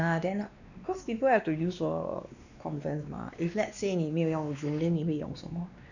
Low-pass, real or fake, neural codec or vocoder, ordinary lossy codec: 7.2 kHz; fake; codec, 16 kHz, 2 kbps, X-Codec, WavLM features, trained on Multilingual LibriSpeech; none